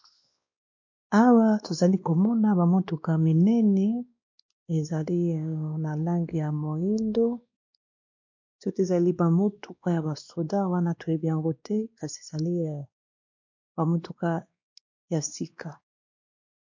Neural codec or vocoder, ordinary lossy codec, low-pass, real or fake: codec, 16 kHz, 2 kbps, X-Codec, WavLM features, trained on Multilingual LibriSpeech; MP3, 48 kbps; 7.2 kHz; fake